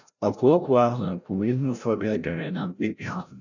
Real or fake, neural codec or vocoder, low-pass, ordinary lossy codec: fake; codec, 16 kHz, 0.5 kbps, FreqCodec, larger model; 7.2 kHz; none